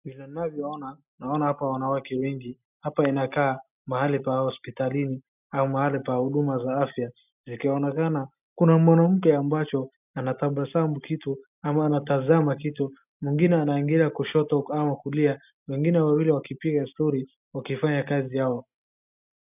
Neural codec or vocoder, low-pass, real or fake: none; 3.6 kHz; real